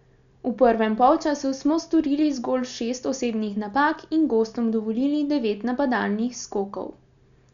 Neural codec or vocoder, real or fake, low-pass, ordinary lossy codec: none; real; 7.2 kHz; none